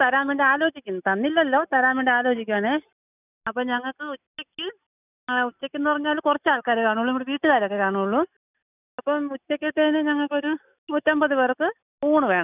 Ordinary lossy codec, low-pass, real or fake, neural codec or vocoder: none; 3.6 kHz; real; none